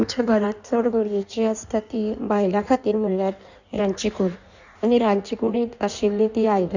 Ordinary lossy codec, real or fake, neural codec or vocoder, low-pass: none; fake; codec, 16 kHz in and 24 kHz out, 1.1 kbps, FireRedTTS-2 codec; 7.2 kHz